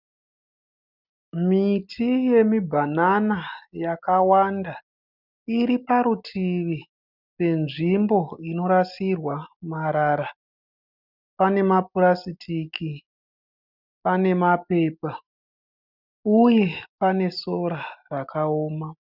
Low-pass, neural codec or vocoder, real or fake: 5.4 kHz; none; real